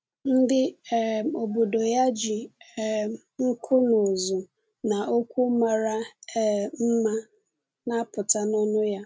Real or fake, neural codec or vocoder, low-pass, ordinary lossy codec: real; none; none; none